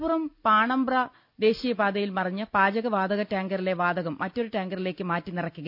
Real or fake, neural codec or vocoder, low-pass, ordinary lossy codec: real; none; 5.4 kHz; none